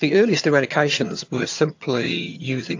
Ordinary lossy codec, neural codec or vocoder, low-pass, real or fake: AAC, 48 kbps; vocoder, 22.05 kHz, 80 mel bands, HiFi-GAN; 7.2 kHz; fake